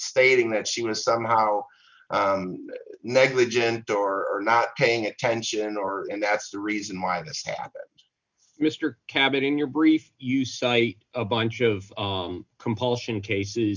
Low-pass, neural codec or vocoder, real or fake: 7.2 kHz; none; real